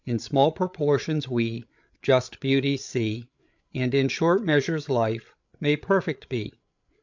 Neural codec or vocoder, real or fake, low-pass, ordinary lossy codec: codec, 16 kHz, 8 kbps, FreqCodec, larger model; fake; 7.2 kHz; MP3, 64 kbps